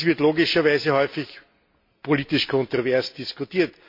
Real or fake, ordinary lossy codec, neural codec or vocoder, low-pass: real; MP3, 32 kbps; none; 5.4 kHz